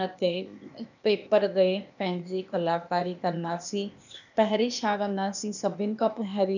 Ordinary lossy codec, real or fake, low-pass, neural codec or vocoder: none; fake; 7.2 kHz; codec, 16 kHz, 0.8 kbps, ZipCodec